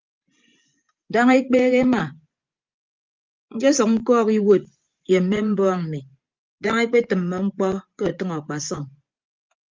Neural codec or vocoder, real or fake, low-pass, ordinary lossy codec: vocoder, 24 kHz, 100 mel bands, Vocos; fake; 7.2 kHz; Opus, 24 kbps